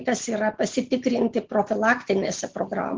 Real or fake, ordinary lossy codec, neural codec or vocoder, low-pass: real; Opus, 16 kbps; none; 7.2 kHz